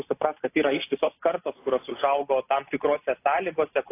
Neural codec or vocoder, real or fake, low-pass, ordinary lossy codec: none; real; 3.6 kHz; AAC, 24 kbps